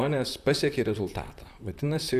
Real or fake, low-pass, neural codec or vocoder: fake; 14.4 kHz; vocoder, 44.1 kHz, 128 mel bands, Pupu-Vocoder